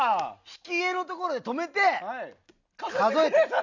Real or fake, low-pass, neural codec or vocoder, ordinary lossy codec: real; 7.2 kHz; none; none